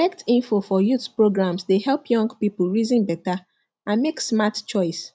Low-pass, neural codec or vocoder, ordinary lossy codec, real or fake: none; none; none; real